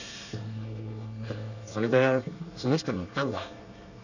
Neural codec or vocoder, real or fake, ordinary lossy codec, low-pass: codec, 24 kHz, 1 kbps, SNAC; fake; none; 7.2 kHz